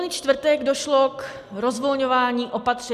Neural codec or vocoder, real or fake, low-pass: none; real; 14.4 kHz